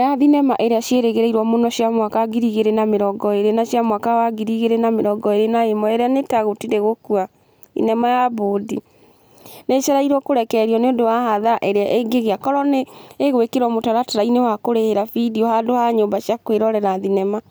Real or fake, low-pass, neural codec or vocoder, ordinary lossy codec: real; none; none; none